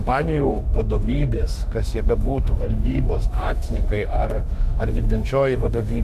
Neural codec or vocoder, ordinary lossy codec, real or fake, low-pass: autoencoder, 48 kHz, 32 numbers a frame, DAC-VAE, trained on Japanese speech; AAC, 96 kbps; fake; 14.4 kHz